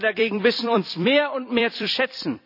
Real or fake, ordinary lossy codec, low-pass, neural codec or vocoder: fake; none; 5.4 kHz; vocoder, 44.1 kHz, 128 mel bands every 256 samples, BigVGAN v2